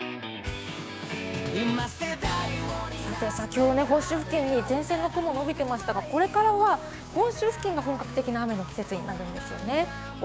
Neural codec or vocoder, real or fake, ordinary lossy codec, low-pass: codec, 16 kHz, 6 kbps, DAC; fake; none; none